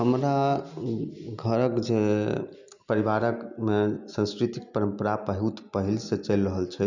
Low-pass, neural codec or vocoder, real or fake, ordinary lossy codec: 7.2 kHz; none; real; none